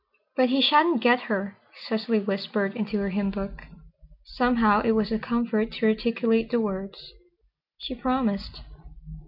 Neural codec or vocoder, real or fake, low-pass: none; real; 5.4 kHz